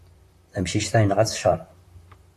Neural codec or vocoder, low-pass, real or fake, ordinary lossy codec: vocoder, 44.1 kHz, 128 mel bands, Pupu-Vocoder; 14.4 kHz; fake; AAC, 48 kbps